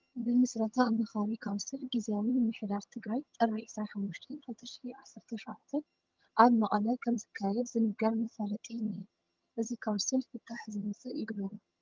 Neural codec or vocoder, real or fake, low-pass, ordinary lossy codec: vocoder, 22.05 kHz, 80 mel bands, HiFi-GAN; fake; 7.2 kHz; Opus, 24 kbps